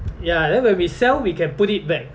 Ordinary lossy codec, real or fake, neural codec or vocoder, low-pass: none; real; none; none